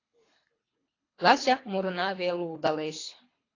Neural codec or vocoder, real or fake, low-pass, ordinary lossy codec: codec, 24 kHz, 3 kbps, HILCodec; fake; 7.2 kHz; AAC, 32 kbps